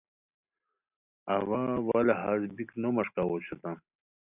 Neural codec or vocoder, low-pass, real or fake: none; 3.6 kHz; real